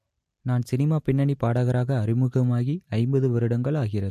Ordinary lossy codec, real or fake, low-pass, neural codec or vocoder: MP3, 64 kbps; real; 14.4 kHz; none